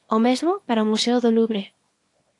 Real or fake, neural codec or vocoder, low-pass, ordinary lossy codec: fake; codec, 24 kHz, 0.9 kbps, WavTokenizer, small release; 10.8 kHz; AAC, 48 kbps